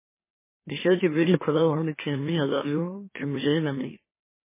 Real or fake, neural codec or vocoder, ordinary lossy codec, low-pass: fake; autoencoder, 44.1 kHz, a latent of 192 numbers a frame, MeloTTS; MP3, 16 kbps; 3.6 kHz